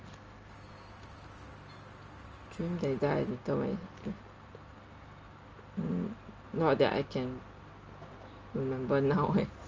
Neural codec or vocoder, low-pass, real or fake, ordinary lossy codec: none; 7.2 kHz; real; Opus, 24 kbps